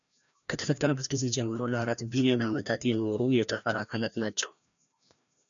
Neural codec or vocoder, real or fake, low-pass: codec, 16 kHz, 1 kbps, FreqCodec, larger model; fake; 7.2 kHz